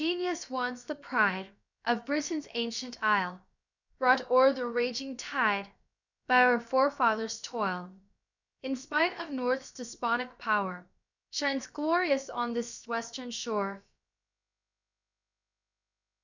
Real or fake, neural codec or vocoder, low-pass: fake; codec, 16 kHz, about 1 kbps, DyCAST, with the encoder's durations; 7.2 kHz